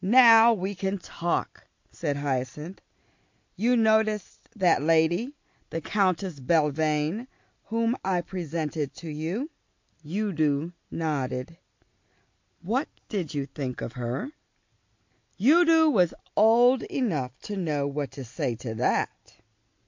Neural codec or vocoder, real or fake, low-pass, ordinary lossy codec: none; real; 7.2 kHz; MP3, 48 kbps